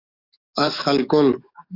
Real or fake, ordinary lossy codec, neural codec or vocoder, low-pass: fake; AAC, 32 kbps; codec, 44.1 kHz, 7.8 kbps, DAC; 5.4 kHz